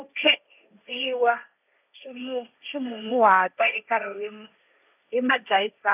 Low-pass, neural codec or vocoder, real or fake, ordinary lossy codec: 3.6 kHz; codec, 16 kHz, 1.1 kbps, Voila-Tokenizer; fake; none